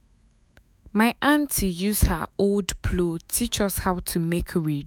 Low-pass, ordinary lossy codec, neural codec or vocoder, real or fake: none; none; autoencoder, 48 kHz, 128 numbers a frame, DAC-VAE, trained on Japanese speech; fake